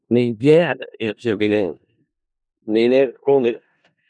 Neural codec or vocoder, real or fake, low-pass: codec, 16 kHz in and 24 kHz out, 0.4 kbps, LongCat-Audio-Codec, four codebook decoder; fake; 9.9 kHz